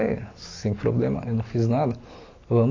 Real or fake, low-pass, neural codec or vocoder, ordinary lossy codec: real; 7.2 kHz; none; AAC, 32 kbps